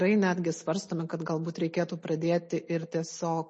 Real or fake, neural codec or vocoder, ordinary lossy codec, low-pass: real; none; MP3, 32 kbps; 10.8 kHz